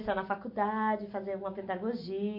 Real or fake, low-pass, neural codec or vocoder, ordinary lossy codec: real; 5.4 kHz; none; MP3, 32 kbps